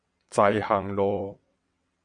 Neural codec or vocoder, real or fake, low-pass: vocoder, 22.05 kHz, 80 mel bands, WaveNeXt; fake; 9.9 kHz